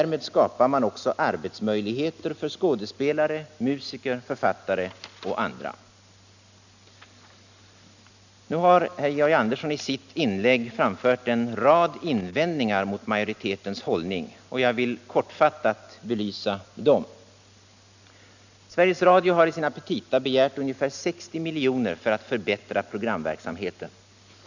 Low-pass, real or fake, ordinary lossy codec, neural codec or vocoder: 7.2 kHz; real; none; none